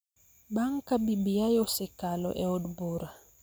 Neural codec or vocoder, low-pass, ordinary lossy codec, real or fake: none; none; none; real